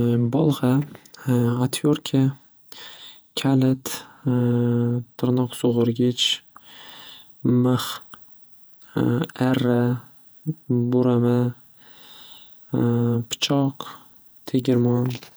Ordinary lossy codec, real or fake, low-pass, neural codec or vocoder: none; fake; none; vocoder, 48 kHz, 128 mel bands, Vocos